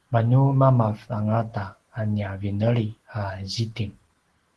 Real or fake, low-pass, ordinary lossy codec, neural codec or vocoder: real; 10.8 kHz; Opus, 16 kbps; none